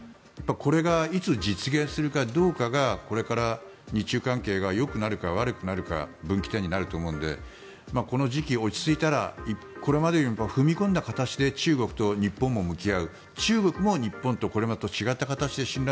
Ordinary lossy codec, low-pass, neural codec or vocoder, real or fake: none; none; none; real